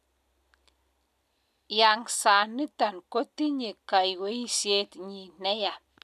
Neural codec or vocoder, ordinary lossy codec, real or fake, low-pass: none; none; real; 14.4 kHz